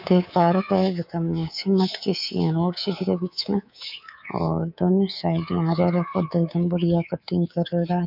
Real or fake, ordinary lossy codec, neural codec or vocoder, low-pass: fake; none; codec, 24 kHz, 3.1 kbps, DualCodec; 5.4 kHz